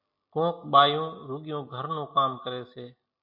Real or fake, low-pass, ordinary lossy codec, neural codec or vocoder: real; 5.4 kHz; MP3, 32 kbps; none